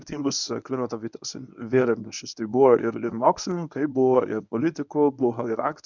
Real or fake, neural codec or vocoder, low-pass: fake; codec, 24 kHz, 0.9 kbps, WavTokenizer, medium speech release version 1; 7.2 kHz